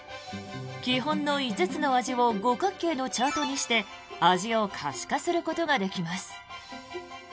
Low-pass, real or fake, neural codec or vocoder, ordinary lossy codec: none; real; none; none